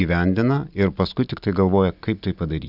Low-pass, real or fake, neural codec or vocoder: 5.4 kHz; real; none